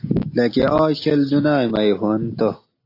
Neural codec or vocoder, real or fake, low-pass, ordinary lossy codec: none; real; 5.4 kHz; AAC, 32 kbps